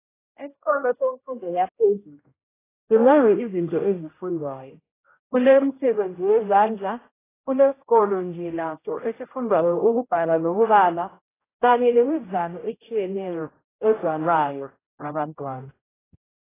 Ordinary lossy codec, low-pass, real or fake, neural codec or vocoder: AAC, 16 kbps; 3.6 kHz; fake; codec, 16 kHz, 0.5 kbps, X-Codec, HuBERT features, trained on general audio